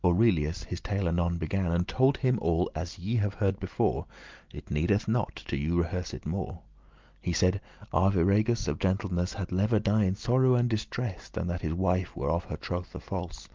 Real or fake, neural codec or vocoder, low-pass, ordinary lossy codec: real; none; 7.2 kHz; Opus, 32 kbps